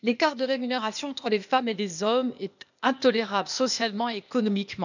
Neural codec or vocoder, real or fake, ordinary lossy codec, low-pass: codec, 16 kHz, 0.8 kbps, ZipCodec; fake; none; 7.2 kHz